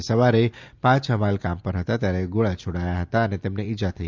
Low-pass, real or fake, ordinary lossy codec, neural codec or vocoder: 7.2 kHz; real; Opus, 24 kbps; none